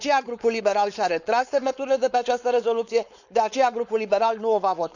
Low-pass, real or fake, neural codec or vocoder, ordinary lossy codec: 7.2 kHz; fake; codec, 16 kHz, 4.8 kbps, FACodec; none